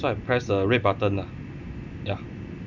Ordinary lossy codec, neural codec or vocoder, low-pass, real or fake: none; none; 7.2 kHz; real